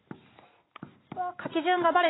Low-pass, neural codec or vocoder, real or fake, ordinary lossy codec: 7.2 kHz; none; real; AAC, 16 kbps